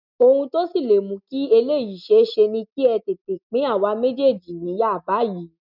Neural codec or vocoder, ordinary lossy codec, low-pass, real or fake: none; none; 5.4 kHz; real